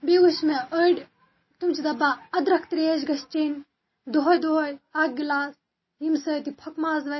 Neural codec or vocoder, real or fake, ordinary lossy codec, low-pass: none; real; MP3, 24 kbps; 7.2 kHz